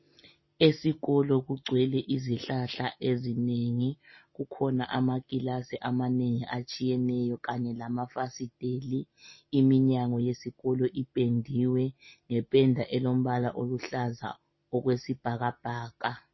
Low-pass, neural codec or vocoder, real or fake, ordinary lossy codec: 7.2 kHz; none; real; MP3, 24 kbps